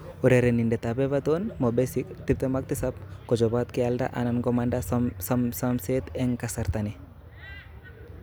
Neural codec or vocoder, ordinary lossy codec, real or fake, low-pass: none; none; real; none